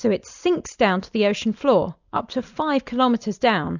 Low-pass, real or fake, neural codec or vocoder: 7.2 kHz; real; none